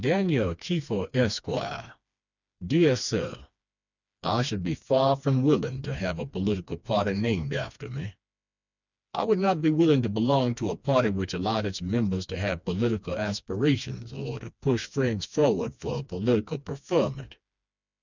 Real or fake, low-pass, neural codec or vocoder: fake; 7.2 kHz; codec, 16 kHz, 2 kbps, FreqCodec, smaller model